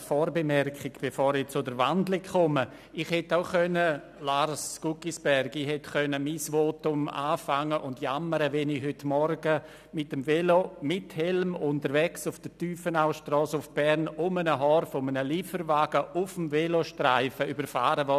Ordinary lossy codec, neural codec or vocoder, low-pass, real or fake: none; none; 14.4 kHz; real